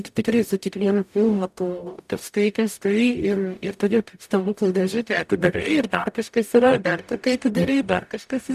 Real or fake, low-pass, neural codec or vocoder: fake; 14.4 kHz; codec, 44.1 kHz, 0.9 kbps, DAC